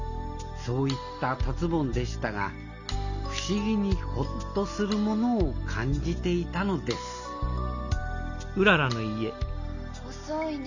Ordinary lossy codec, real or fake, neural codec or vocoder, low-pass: none; real; none; 7.2 kHz